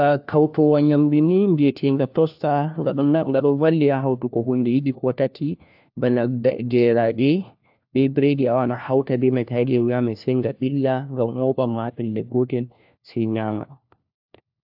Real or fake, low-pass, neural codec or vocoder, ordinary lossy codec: fake; 5.4 kHz; codec, 16 kHz, 1 kbps, FunCodec, trained on LibriTTS, 50 frames a second; AAC, 48 kbps